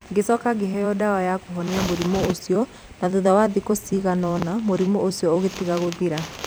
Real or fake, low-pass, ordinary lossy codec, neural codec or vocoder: fake; none; none; vocoder, 44.1 kHz, 128 mel bands every 256 samples, BigVGAN v2